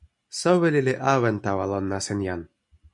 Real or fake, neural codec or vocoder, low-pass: real; none; 10.8 kHz